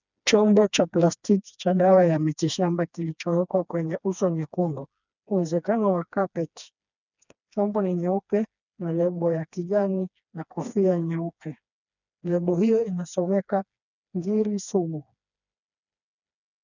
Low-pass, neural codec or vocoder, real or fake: 7.2 kHz; codec, 16 kHz, 2 kbps, FreqCodec, smaller model; fake